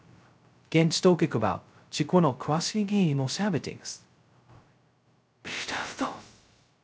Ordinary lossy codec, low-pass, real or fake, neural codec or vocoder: none; none; fake; codec, 16 kHz, 0.2 kbps, FocalCodec